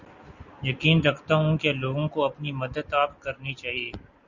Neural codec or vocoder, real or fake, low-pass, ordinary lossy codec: none; real; 7.2 kHz; Opus, 64 kbps